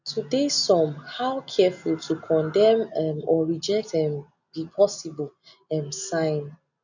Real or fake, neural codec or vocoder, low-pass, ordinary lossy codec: real; none; 7.2 kHz; none